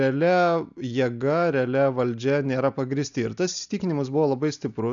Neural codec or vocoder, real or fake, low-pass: none; real; 7.2 kHz